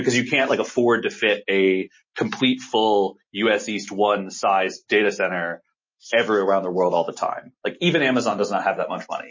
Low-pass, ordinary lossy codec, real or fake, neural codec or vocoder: 7.2 kHz; MP3, 32 kbps; real; none